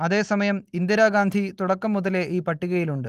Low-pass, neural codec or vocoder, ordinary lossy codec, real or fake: 14.4 kHz; none; Opus, 24 kbps; real